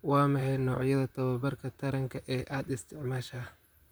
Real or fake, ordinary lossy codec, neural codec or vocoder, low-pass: fake; none; vocoder, 44.1 kHz, 128 mel bands, Pupu-Vocoder; none